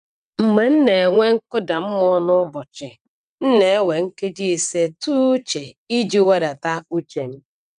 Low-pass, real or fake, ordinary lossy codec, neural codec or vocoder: 9.9 kHz; fake; AAC, 96 kbps; vocoder, 22.05 kHz, 80 mel bands, WaveNeXt